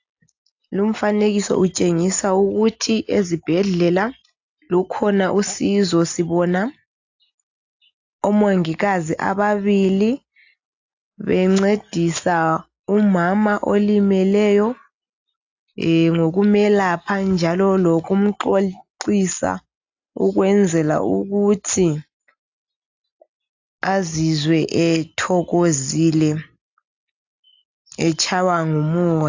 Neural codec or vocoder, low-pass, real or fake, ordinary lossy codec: none; 7.2 kHz; real; AAC, 48 kbps